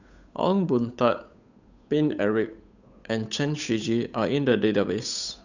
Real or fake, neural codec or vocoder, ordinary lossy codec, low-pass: fake; codec, 16 kHz, 8 kbps, FunCodec, trained on Chinese and English, 25 frames a second; none; 7.2 kHz